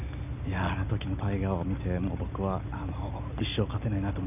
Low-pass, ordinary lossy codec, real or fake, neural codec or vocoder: 3.6 kHz; none; real; none